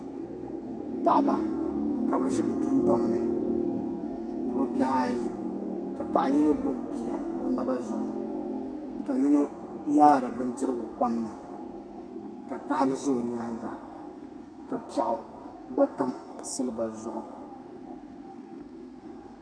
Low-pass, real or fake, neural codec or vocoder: 9.9 kHz; fake; codec, 32 kHz, 1.9 kbps, SNAC